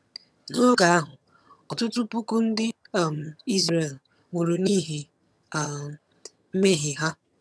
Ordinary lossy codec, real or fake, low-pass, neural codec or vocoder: none; fake; none; vocoder, 22.05 kHz, 80 mel bands, HiFi-GAN